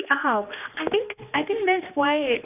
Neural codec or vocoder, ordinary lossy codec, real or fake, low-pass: codec, 16 kHz, 2 kbps, X-Codec, HuBERT features, trained on general audio; none; fake; 3.6 kHz